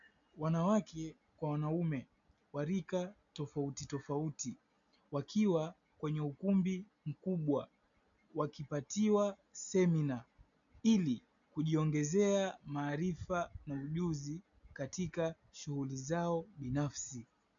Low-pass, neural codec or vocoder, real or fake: 7.2 kHz; none; real